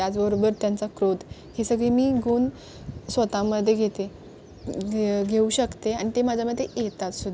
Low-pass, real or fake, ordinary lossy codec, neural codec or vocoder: none; real; none; none